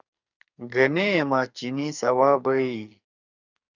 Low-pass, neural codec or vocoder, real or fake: 7.2 kHz; codec, 44.1 kHz, 2.6 kbps, SNAC; fake